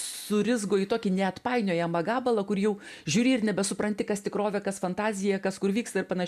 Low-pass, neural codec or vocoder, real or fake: 14.4 kHz; none; real